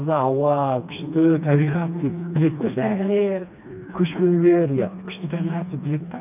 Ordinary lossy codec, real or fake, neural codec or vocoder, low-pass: none; fake; codec, 16 kHz, 2 kbps, FreqCodec, smaller model; 3.6 kHz